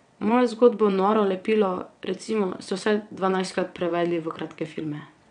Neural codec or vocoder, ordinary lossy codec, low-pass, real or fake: vocoder, 22.05 kHz, 80 mel bands, WaveNeXt; none; 9.9 kHz; fake